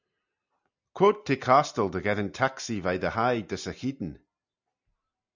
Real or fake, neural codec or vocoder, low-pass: real; none; 7.2 kHz